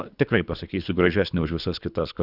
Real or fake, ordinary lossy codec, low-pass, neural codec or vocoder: fake; Opus, 64 kbps; 5.4 kHz; codec, 24 kHz, 3 kbps, HILCodec